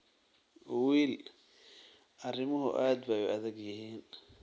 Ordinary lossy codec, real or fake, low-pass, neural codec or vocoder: none; real; none; none